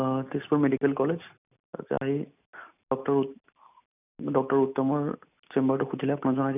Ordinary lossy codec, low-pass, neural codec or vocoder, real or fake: none; 3.6 kHz; none; real